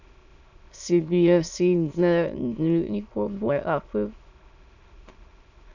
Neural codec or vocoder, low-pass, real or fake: autoencoder, 22.05 kHz, a latent of 192 numbers a frame, VITS, trained on many speakers; 7.2 kHz; fake